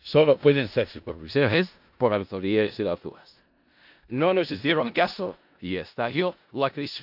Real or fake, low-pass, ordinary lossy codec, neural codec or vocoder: fake; 5.4 kHz; AAC, 48 kbps; codec, 16 kHz in and 24 kHz out, 0.4 kbps, LongCat-Audio-Codec, four codebook decoder